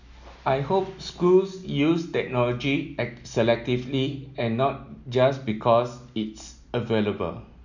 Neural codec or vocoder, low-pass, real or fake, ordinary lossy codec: none; 7.2 kHz; real; none